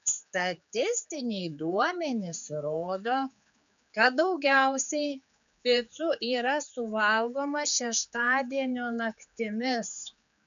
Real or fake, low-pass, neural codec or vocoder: fake; 7.2 kHz; codec, 16 kHz, 4 kbps, X-Codec, HuBERT features, trained on general audio